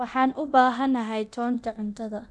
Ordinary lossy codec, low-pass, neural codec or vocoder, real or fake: none; none; codec, 24 kHz, 0.9 kbps, DualCodec; fake